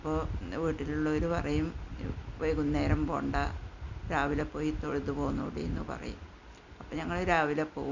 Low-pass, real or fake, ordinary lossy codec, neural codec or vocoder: 7.2 kHz; real; none; none